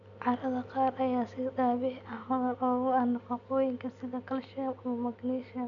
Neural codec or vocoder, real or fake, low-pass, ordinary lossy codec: none; real; 7.2 kHz; none